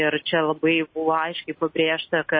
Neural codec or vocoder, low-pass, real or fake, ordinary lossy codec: none; 7.2 kHz; real; MP3, 24 kbps